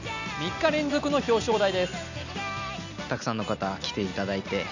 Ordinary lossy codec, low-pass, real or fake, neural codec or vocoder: none; 7.2 kHz; real; none